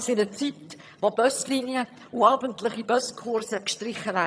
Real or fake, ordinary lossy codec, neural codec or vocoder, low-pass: fake; none; vocoder, 22.05 kHz, 80 mel bands, HiFi-GAN; none